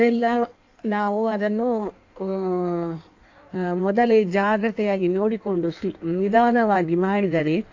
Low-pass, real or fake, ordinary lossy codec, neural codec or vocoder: 7.2 kHz; fake; AAC, 48 kbps; codec, 16 kHz in and 24 kHz out, 1.1 kbps, FireRedTTS-2 codec